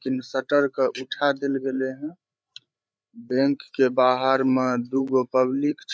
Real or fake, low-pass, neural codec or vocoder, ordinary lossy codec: fake; none; codec, 16 kHz, 8 kbps, FreqCodec, larger model; none